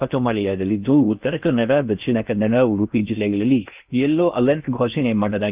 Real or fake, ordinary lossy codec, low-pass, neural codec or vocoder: fake; Opus, 24 kbps; 3.6 kHz; codec, 16 kHz in and 24 kHz out, 0.6 kbps, FocalCodec, streaming, 4096 codes